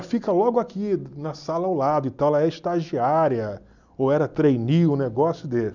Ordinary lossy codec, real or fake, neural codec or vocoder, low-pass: none; real; none; 7.2 kHz